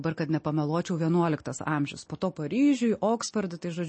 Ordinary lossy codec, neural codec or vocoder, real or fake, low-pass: MP3, 32 kbps; none; real; 9.9 kHz